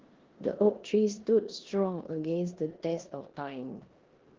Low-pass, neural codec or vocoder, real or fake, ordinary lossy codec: 7.2 kHz; codec, 16 kHz in and 24 kHz out, 0.9 kbps, LongCat-Audio-Codec, four codebook decoder; fake; Opus, 16 kbps